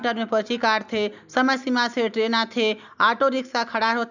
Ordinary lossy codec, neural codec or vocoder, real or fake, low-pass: none; none; real; 7.2 kHz